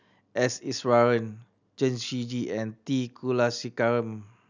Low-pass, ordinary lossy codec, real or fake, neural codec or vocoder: 7.2 kHz; none; real; none